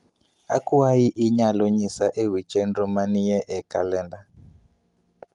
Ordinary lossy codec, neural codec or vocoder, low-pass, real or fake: Opus, 32 kbps; none; 10.8 kHz; real